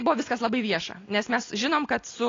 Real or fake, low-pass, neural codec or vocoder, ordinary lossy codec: real; 7.2 kHz; none; AAC, 32 kbps